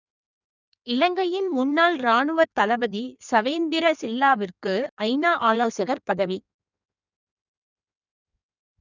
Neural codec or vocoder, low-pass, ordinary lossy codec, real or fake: codec, 16 kHz in and 24 kHz out, 1.1 kbps, FireRedTTS-2 codec; 7.2 kHz; none; fake